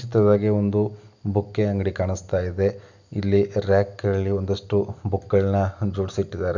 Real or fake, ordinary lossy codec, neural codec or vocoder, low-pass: real; none; none; 7.2 kHz